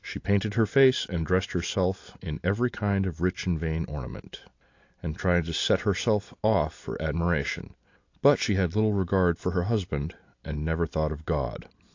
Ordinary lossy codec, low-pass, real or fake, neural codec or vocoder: AAC, 48 kbps; 7.2 kHz; real; none